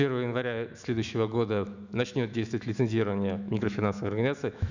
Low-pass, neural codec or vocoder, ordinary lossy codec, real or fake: 7.2 kHz; autoencoder, 48 kHz, 128 numbers a frame, DAC-VAE, trained on Japanese speech; none; fake